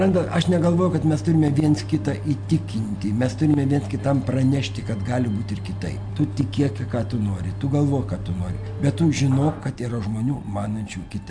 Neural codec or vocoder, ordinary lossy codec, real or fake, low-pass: none; MP3, 64 kbps; real; 9.9 kHz